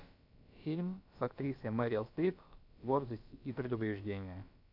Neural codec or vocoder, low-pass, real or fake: codec, 16 kHz, about 1 kbps, DyCAST, with the encoder's durations; 5.4 kHz; fake